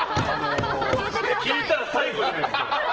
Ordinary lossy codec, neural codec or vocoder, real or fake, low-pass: Opus, 16 kbps; none; real; 7.2 kHz